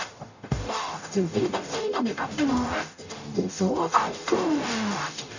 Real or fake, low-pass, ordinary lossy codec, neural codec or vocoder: fake; 7.2 kHz; none; codec, 44.1 kHz, 0.9 kbps, DAC